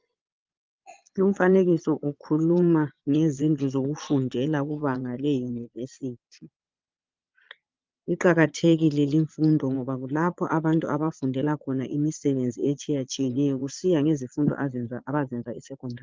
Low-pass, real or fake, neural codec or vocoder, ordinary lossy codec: 7.2 kHz; fake; vocoder, 22.05 kHz, 80 mel bands, Vocos; Opus, 24 kbps